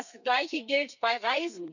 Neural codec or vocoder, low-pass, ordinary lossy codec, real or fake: codec, 32 kHz, 1.9 kbps, SNAC; 7.2 kHz; none; fake